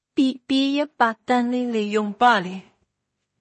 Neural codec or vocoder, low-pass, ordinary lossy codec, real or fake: codec, 16 kHz in and 24 kHz out, 0.4 kbps, LongCat-Audio-Codec, two codebook decoder; 10.8 kHz; MP3, 32 kbps; fake